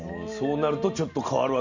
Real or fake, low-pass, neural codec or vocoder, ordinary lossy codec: real; 7.2 kHz; none; none